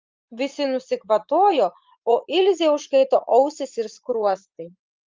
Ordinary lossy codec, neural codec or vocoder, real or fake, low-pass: Opus, 24 kbps; vocoder, 44.1 kHz, 80 mel bands, Vocos; fake; 7.2 kHz